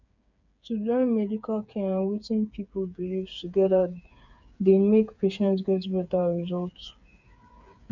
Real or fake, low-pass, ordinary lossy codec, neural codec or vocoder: fake; 7.2 kHz; none; codec, 16 kHz, 8 kbps, FreqCodec, smaller model